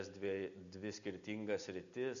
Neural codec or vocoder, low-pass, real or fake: none; 7.2 kHz; real